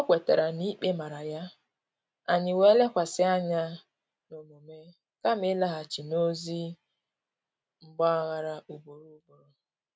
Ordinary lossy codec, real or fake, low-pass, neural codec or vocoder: none; real; none; none